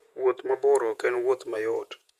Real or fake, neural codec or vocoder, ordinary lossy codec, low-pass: fake; vocoder, 48 kHz, 128 mel bands, Vocos; Opus, 64 kbps; 14.4 kHz